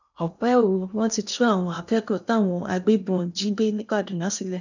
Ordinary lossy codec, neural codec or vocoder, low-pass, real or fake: none; codec, 16 kHz in and 24 kHz out, 0.8 kbps, FocalCodec, streaming, 65536 codes; 7.2 kHz; fake